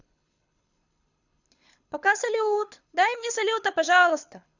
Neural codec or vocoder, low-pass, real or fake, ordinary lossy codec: codec, 24 kHz, 6 kbps, HILCodec; 7.2 kHz; fake; none